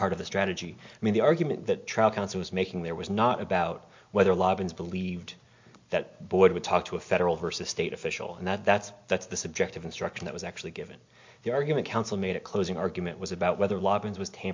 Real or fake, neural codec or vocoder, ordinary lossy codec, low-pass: real; none; MP3, 48 kbps; 7.2 kHz